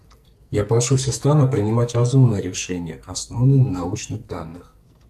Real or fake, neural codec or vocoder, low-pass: fake; codec, 44.1 kHz, 2.6 kbps, SNAC; 14.4 kHz